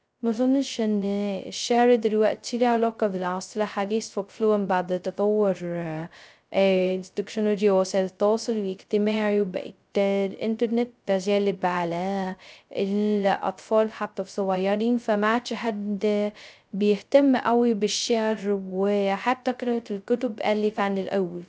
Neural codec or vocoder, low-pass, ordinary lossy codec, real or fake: codec, 16 kHz, 0.2 kbps, FocalCodec; none; none; fake